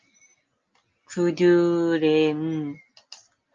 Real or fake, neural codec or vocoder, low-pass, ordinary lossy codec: real; none; 7.2 kHz; Opus, 24 kbps